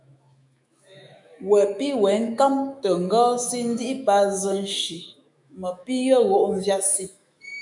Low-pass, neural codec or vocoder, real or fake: 10.8 kHz; autoencoder, 48 kHz, 128 numbers a frame, DAC-VAE, trained on Japanese speech; fake